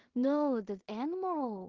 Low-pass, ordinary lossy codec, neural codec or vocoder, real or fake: 7.2 kHz; Opus, 16 kbps; codec, 16 kHz in and 24 kHz out, 0.4 kbps, LongCat-Audio-Codec, two codebook decoder; fake